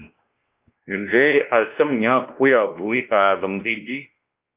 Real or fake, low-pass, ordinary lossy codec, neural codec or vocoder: fake; 3.6 kHz; Opus, 24 kbps; codec, 16 kHz, 1 kbps, X-Codec, WavLM features, trained on Multilingual LibriSpeech